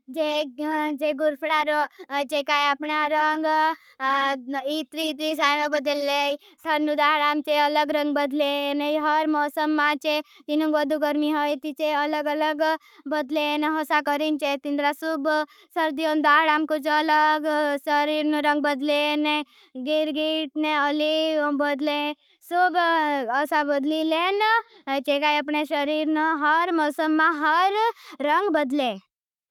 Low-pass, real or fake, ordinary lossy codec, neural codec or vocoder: 19.8 kHz; fake; none; codec, 44.1 kHz, 7.8 kbps, Pupu-Codec